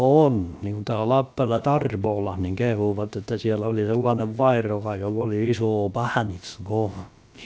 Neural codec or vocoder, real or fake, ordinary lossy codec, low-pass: codec, 16 kHz, about 1 kbps, DyCAST, with the encoder's durations; fake; none; none